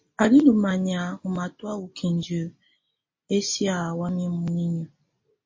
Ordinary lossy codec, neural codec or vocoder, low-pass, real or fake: MP3, 32 kbps; none; 7.2 kHz; real